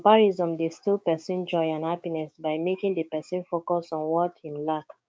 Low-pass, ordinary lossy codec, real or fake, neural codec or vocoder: none; none; fake; codec, 16 kHz, 6 kbps, DAC